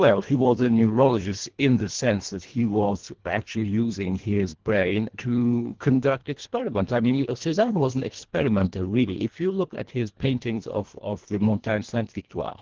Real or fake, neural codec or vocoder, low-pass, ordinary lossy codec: fake; codec, 24 kHz, 1.5 kbps, HILCodec; 7.2 kHz; Opus, 16 kbps